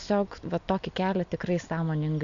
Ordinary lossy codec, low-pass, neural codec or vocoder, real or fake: MP3, 96 kbps; 7.2 kHz; none; real